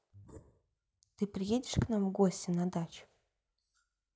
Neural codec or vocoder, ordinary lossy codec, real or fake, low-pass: none; none; real; none